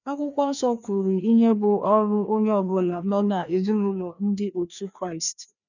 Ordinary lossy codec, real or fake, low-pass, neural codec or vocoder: none; fake; 7.2 kHz; codec, 16 kHz, 2 kbps, FreqCodec, larger model